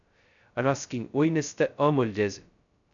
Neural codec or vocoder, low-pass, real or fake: codec, 16 kHz, 0.2 kbps, FocalCodec; 7.2 kHz; fake